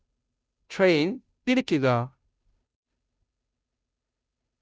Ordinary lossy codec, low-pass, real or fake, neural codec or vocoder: none; none; fake; codec, 16 kHz, 0.5 kbps, FunCodec, trained on Chinese and English, 25 frames a second